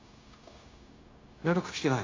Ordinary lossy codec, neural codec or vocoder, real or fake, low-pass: AAC, 32 kbps; codec, 16 kHz, 0.9 kbps, LongCat-Audio-Codec; fake; 7.2 kHz